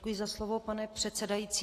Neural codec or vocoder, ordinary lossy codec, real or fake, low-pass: none; AAC, 48 kbps; real; 14.4 kHz